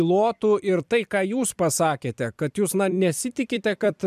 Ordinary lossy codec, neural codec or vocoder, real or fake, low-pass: MP3, 96 kbps; vocoder, 44.1 kHz, 128 mel bands every 256 samples, BigVGAN v2; fake; 14.4 kHz